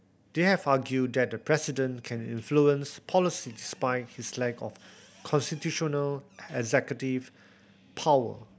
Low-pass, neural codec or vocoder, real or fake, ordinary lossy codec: none; none; real; none